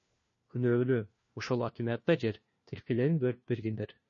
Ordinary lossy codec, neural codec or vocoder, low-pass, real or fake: MP3, 32 kbps; codec, 16 kHz, 1 kbps, FunCodec, trained on LibriTTS, 50 frames a second; 7.2 kHz; fake